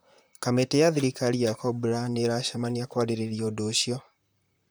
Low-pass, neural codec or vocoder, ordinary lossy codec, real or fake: none; vocoder, 44.1 kHz, 128 mel bands, Pupu-Vocoder; none; fake